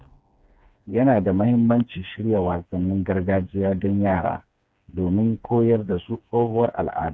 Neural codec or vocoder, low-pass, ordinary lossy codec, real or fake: codec, 16 kHz, 4 kbps, FreqCodec, smaller model; none; none; fake